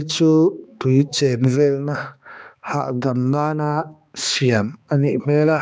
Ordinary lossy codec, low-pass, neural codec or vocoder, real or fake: none; none; codec, 16 kHz, 2 kbps, X-Codec, HuBERT features, trained on balanced general audio; fake